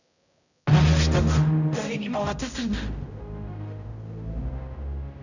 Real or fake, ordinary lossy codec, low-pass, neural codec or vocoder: fake; none; 7.2 kHz; codec, 16 kHz, 0.5 kbps, X-Codec, HuBERT features, trained on general audio